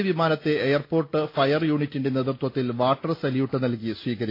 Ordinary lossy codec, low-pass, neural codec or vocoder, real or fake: AAC, 32 kbps; 5.4 kHz; none; real